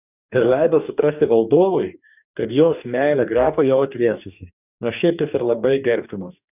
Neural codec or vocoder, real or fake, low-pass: codec, 44.1 kHz, 2.6 kbps, DAC; fake; 3.6 kHz